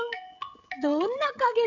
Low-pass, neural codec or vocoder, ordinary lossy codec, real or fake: 7.2 kHz; codec, 16 kHz, 16 kbps, FreqCodec, smaller model; none; fake